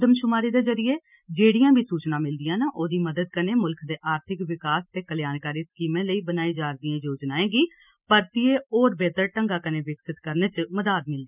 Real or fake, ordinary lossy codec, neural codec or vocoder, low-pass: real; none; none; 3.6 kHz